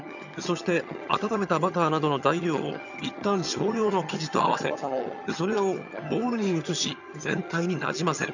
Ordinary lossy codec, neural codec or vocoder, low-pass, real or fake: none; vocoder, 22.05 kHz, 80 mel bands, HiFi-GAN; 7.2 kHz; fake